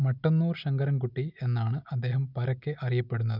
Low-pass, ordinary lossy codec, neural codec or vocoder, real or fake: 5.4 kHz; none; none; real